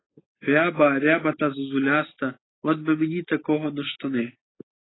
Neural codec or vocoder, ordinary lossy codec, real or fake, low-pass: none; AAC, 16 kbps; real; 7.2 kHz